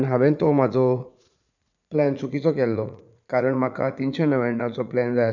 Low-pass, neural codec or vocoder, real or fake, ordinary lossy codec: 7.2 kHz; vocoder, 44.1 kHz, 80 mel bands, Vocos; fake; none